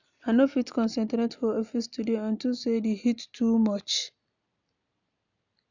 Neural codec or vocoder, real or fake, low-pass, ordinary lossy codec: none; real; 7.2 kHz; none